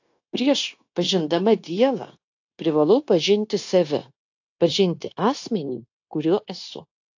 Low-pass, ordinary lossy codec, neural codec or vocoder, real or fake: 7.2 kHz; AAC, 48 kbps; codec, 16 kHz, 0.9 kbps, LongCat-Audio-Codec; fake